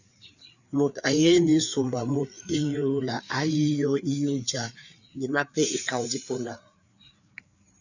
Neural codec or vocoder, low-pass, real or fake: codec, 16 kHz, 4 kbps, FreqCodec, larger model; 7.2 kHz; fake